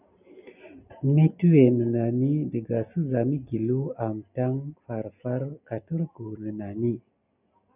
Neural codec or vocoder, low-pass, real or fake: none; 3.6 kHz; real